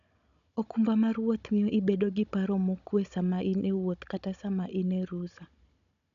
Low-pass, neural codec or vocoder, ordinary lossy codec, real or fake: 7.2 kHz; codec, 16 kHz, 16 kbps, FunCodec, trained on Chinese and English, 50 frames a second; none; fake